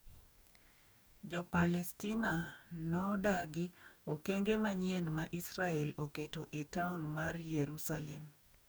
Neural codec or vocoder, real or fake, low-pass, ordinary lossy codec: codec, 44.1 kHz, 2.6 kbps, DAC; fake; none; none